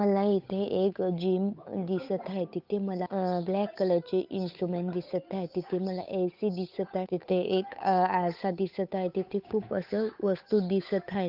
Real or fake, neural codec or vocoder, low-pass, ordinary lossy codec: fake; codec, 16 kHz, 8 kbps, FunCodec, trained on Chinese and English, 25 frames a second; 5.4 kHz; none